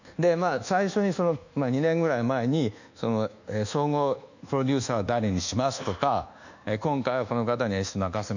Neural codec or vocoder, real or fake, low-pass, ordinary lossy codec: codec, 24 kHz, 1.2 kbps, DualCodec; fake; 7.2 kHz; none